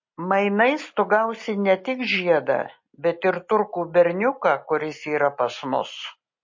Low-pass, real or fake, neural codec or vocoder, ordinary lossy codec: 7.2 kHz; real; none; MP3, 32 kbps